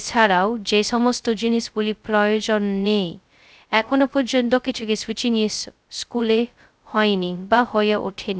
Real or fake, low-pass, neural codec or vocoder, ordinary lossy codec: fake; none; codec, 16 kHz, 0.2 kbps, FocalCodec; none